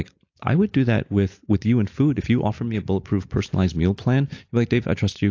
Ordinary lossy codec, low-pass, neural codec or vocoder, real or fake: AAC, 48 kbps; 7.2 kHz; none; real